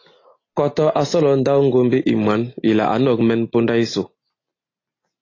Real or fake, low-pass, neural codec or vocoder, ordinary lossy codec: real; 7.2 kHz; none; AAC, 32 kbps